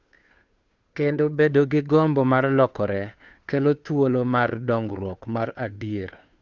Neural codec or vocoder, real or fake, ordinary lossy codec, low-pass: codec, 16 kHz, 2 kbps, FunCodec, trained on Chinese and English, 25 frames a second; fake; Opus, 64 kbps; 7.2 kHz